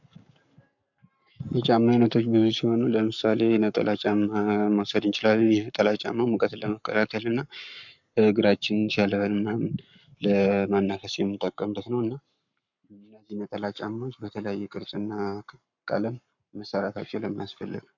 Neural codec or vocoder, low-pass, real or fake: codec, 44.1 kHz, 7.8 kbps, Pupu-Codec; 7.2 kHz; fake